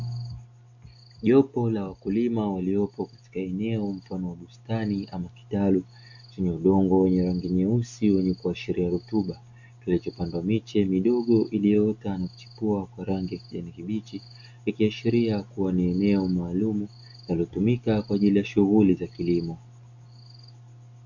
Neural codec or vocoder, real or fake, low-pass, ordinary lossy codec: none; real; 7.2 kHz; Opus, 64 kbps